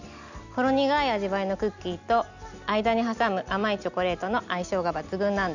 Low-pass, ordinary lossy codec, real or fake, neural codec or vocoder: 7.2 kHz; none; real; none